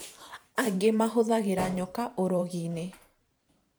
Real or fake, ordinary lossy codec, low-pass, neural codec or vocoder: fake; none; none; vocoder, 44.1 kHz, 128 mel bands every 512 samples, BigVGAN v2